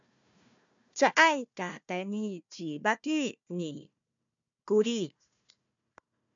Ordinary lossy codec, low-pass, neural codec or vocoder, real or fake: MP3, 64 kbps; 7.2 kHz; codec, 16 kHz, 1 kbps, FunCodec, trained on Chinese and English, 50 frames a second; fake